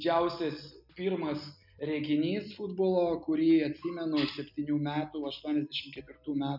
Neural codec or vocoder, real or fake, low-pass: none; real; 5.4 kHz